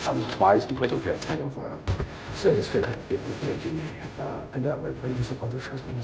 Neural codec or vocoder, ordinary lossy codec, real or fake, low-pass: codec, 16 kHz, 0.5 kbps, FunCodec, trained on Chinese and English, 25 frames a second; none; fake; none